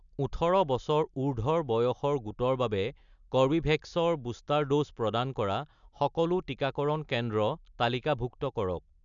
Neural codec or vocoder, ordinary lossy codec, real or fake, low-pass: none; MP3, 96 kbps; real; 7.2 kHz